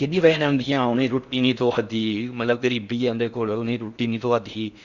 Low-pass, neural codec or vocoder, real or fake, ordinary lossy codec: 7.2 kHz; codec, 16 kHz in and 24 kHz out, 0.6 kbps, FocalCodec, streaming, 4096 codes; fake; Opus, 64 kbps